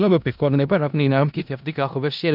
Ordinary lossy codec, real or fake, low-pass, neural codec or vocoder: none; fake; 5.4 kHz; codec, 16 kHz in and 24 kHz out, 0.4 kbps, LongCat-Audio-Codec, four codebook decoder